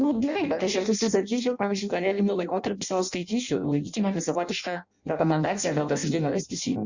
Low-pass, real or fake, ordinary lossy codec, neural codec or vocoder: 7.2 kHz; fake; Opus, 64 kbps; codec, 16 kHz in and 24 kHz out, 0.6 kbps, FireRedTTS-2 codec